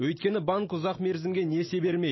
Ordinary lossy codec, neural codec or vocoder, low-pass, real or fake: MP3, 24 kbps; none; 7.2 kHz; real